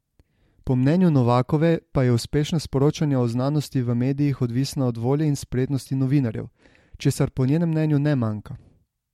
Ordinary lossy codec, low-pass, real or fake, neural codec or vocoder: MP3, 64 kbps; 19.8 kHz; real; none